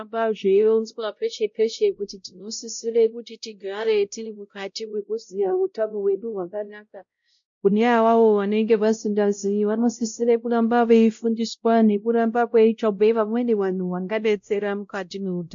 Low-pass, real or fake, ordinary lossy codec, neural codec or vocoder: 7.2 kHz; fake; MP3, 48 kbps; codec, 16 kHz, 0.5 kbps, X-Codec, WavLM features, trained on Multilingual LibriSpeech